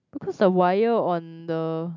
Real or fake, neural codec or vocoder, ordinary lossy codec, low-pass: real; none; none; 7.2 kHz